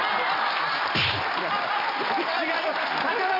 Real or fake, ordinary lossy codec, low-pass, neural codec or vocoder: real; AAC, 24 kbps; 5.4 kHz; none